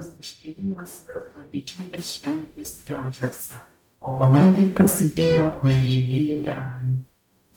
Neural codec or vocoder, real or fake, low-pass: codec, 44.1 kHz, 0.9 kbps, DAC; fake; 19.8 kHz